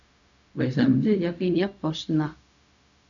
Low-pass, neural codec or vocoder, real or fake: 7.2 kHz; codec, 16 kHz, 0.4 kbps, LongCat-Audio-Codec; fake